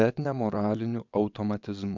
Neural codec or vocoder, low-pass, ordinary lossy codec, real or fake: vocoder, 22.05 kHz, 80 mel bands, WaveNeXt; 7.2 kHz; MP3, 64 kbps; fake